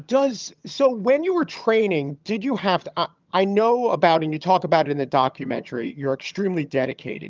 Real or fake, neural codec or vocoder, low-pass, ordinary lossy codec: fake; vocoder, 22.05 kHz, 80 mel bands, HiFi-GAN; 7.2 kHz; Opus, 24 kbps